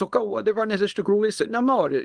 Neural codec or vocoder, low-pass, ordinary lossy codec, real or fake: codec, 24 kHz, 0.9 kbps, WavTokenizer, small release; 9.9 kHz; Opus, 24 kbps; fake